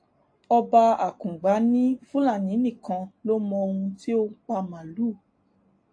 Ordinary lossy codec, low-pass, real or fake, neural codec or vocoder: AAC, 48 kbps; 9.9 kHz; real; none